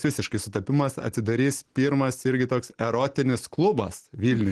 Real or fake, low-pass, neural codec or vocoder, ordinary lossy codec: real; 10.8 kHz; none; Opus, 16 kbps